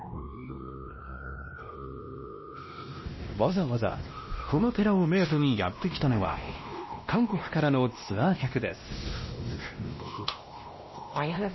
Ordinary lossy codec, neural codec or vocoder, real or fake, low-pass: MP3, 24 kbps; codec, 16 kHz, 1 kbps, X-Codec, WavLM features, trained on Multilingual LibriSpeech; fake; 7.2 kHz